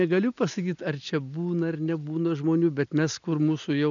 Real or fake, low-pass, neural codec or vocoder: real; 7.2 kHz; none